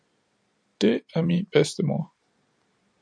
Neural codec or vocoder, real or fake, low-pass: vocoder, 44.1 kHz, 128 mel bands every 256 samples, BigVGAN v2; fake; 9.9 kHz